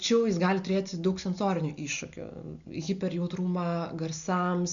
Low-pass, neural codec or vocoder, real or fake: 7.2 kHz; none; real